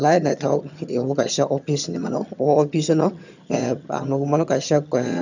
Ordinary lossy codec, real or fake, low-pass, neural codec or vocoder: none; fake; 7.2 kHz; vocoder, 22.05 kHz, 80 mel bands, HiFi-GAN